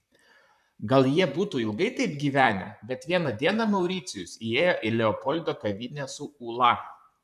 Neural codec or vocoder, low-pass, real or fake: vocoder, 44.1 kHz, 128 mel bands, Pupu-Vocoder; 14.4 kHz; fake